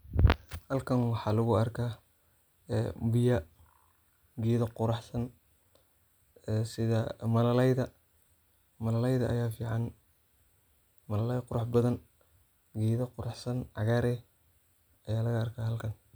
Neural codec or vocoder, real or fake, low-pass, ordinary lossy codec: none; real; none; none